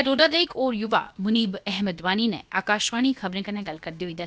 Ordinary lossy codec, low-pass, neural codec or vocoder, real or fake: none; none; codec, 16 kHz, about 1 kbps, DyCAST, with the encoder's durations; fake